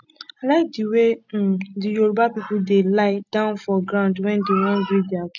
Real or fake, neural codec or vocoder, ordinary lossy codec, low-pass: real; none; none; 7.2 kHz